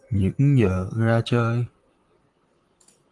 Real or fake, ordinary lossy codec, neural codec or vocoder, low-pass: fake; Opus, 24 kbps; vocoder, 44.1 kHz, 128 mel bands, Pupu-Vocoder; 10.8 kHz